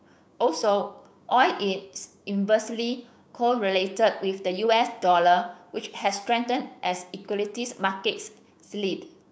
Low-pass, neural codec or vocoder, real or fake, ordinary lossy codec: none; codec, 16 kHz, 6 kbps, DAC; fake; none